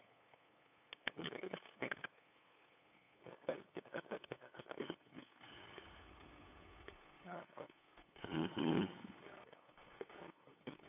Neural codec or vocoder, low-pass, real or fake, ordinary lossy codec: codec, 16 kHz, 8 kbps, FunCodec, trained on LibriTTS, 25 frames a second; 3.6 kHz; fake; none